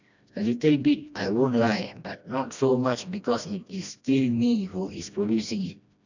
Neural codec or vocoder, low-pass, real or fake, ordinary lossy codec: codec, 16 kHz, 1 kbps, FreqCodec, smaller model; 7.2 kHz; fake; none